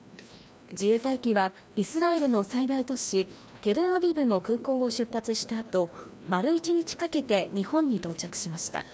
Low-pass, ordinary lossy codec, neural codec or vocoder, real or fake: none; none; codec, 16 kHz, 1 kbps, FreqCodec, larger model; fake